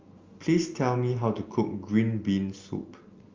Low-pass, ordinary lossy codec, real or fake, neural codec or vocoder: 7.2 kHz; Opus, 32 kbps; real; none